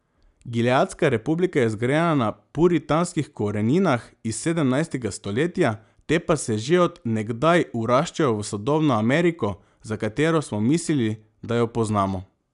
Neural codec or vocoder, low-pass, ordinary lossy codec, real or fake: none; 10.8 kHz; none; real